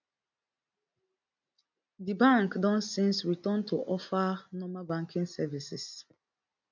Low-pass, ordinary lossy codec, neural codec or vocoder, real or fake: 7.2 kHz; none; none; real